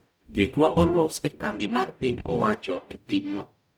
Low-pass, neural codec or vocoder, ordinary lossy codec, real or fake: none; codec, 44.1 kHz, 0.9 kbps, DAC; none; fake